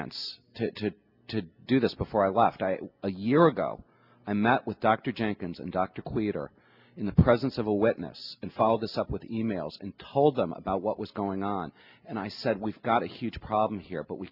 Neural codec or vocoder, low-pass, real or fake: none; 5.4 kHz; real